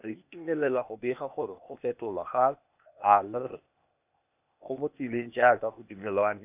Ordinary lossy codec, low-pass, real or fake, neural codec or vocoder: none; 3.6 kHz; fake; codec, 16 kHz, 0.8 kbps, ZipCodec